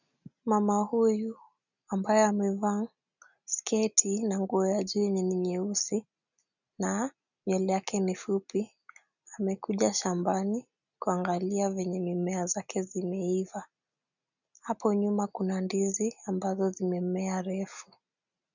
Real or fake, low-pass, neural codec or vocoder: real; 7.2 kHz; none